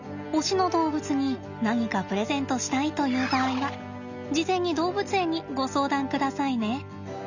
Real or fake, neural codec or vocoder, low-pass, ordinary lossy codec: real; none; 7.2 kHz; none